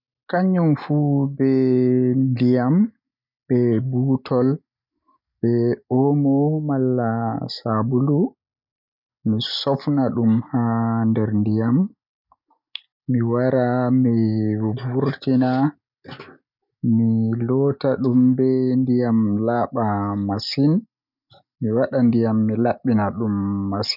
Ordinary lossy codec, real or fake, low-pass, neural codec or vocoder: none; real; 5.4 kHz; none